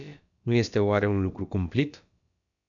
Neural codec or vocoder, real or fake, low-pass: codec, 16 kHz, about 1 kbps, DyCAST, with the encoder's durations; fake; 7.2 kHz